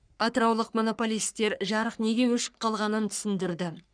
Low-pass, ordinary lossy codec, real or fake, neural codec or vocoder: 9.9 kHz; none; fake; codec, 44.1 kHz, 3.4 kbps, Pupu-Codec